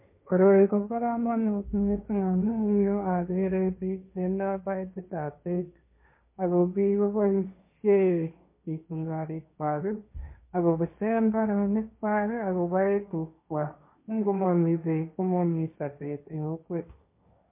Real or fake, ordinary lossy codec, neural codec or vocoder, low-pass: fake; MP3, 24 kbps; codec, 16 kHz, 1.1 kbps, Voila-Tokenizer; 3.6 kHz